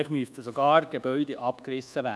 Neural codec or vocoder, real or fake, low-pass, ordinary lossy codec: codec, 24 kHz, 1.2 kbps, DualCodec; fake; none; none